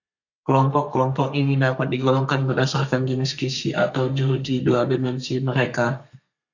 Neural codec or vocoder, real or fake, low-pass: codec, 32 kHz, 1.9 kbps, SNAC; fake; 7.2 kHz